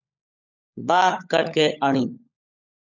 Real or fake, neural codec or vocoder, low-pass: fake; codec, 16 kHz, 16 kbps, FunCodec, trained on LibriTTS, 50 frames a second; 7.2 kHz